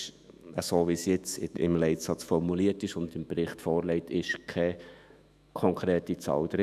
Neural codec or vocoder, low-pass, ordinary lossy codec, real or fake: autoencoder, 48 kHz, 128 numbers a frame, DAC-VAE, trained on Japanese speech; 14.4 kHz; none; fake